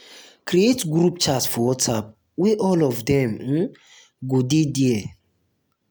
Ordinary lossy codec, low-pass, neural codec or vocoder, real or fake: none; none; none; real